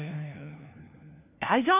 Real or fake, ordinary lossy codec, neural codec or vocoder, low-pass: fake; none; codec, 16 kHz, 1 kbps, FunCodec, trained on LibriTTS, 50 frames a second; 3.6 kHz